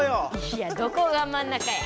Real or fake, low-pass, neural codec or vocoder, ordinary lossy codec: real; none; none; none